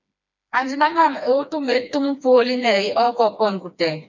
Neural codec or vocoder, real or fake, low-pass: codec, 16 kHz, 2 kbps, FreqCodec, smaller model; fake; 7.2 kHz